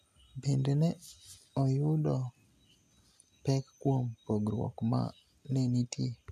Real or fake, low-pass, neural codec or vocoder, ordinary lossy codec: real; 14.4 kHz; none; none